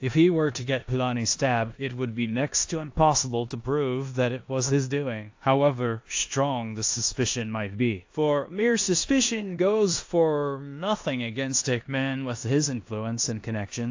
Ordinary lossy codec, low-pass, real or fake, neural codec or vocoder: AAC, 48 kbps; 7.2 kHz; fake; codec, 16 kHz in and 24 kHz out, 0.9 kbps, LongCat-Audio-Codec, four codebook decoder